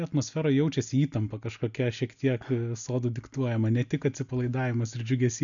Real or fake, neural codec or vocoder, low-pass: real; none; 7.2 kHz